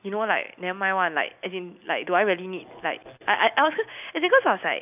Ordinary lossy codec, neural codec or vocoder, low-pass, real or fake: none; none; 3.6 kHz; real